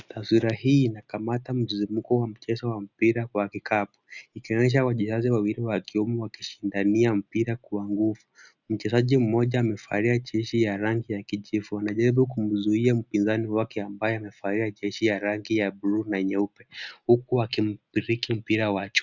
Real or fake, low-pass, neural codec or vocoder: real; 7.2 kHz; none